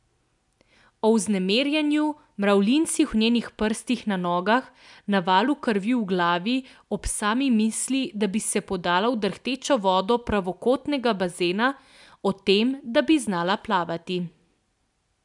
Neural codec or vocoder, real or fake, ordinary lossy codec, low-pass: none; real; MP3, 96 kbps; 10.8 kHz